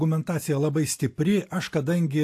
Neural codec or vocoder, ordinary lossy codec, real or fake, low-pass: none; AAC, 64 kbps; real; 14.4 kHz